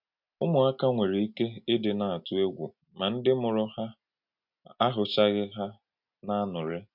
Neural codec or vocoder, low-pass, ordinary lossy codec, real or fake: none; 5.4 kHz; MP3, 48 kbps; real